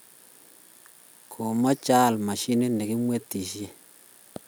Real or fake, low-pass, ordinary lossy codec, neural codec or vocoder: real; none; none; none